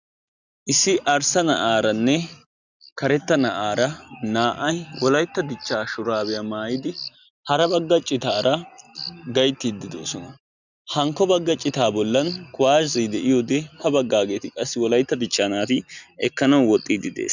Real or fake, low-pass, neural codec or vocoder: real; 7.2 kHz; none